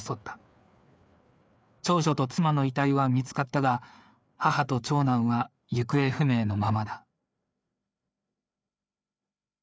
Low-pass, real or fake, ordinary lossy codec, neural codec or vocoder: none; fake; none; codec, 16 kHz, 4 kbps, FreqCodec, larger model